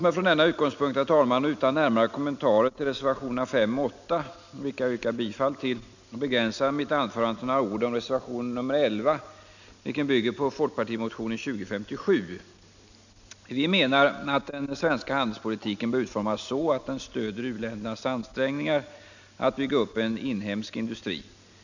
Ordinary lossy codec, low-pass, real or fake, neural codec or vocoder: none; 7.2 kHz; real; none